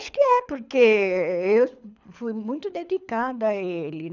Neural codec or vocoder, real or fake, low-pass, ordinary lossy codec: codec, 24 kHz, 6 kbps, HILCodec; fake; 7.2 kHz; none